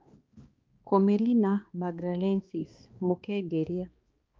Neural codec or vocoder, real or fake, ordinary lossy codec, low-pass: codec, 16 kHz, 2 kbps, X-Codec, HuBERT features, trained on balanced general audio; fake; Opus, 24 kbps; 7.2 kHz